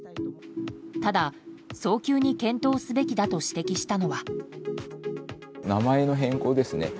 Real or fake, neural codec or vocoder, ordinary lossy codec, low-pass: real; none; none; none